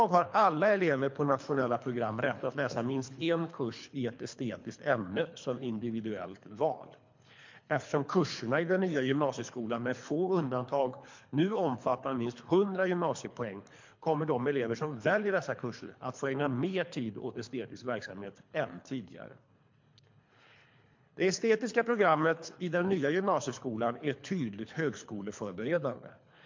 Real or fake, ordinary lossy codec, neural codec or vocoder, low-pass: fake; MP3, 48 kbps; codec, 24 kHz, 3 kbps, HILCodec; 7.2 kHz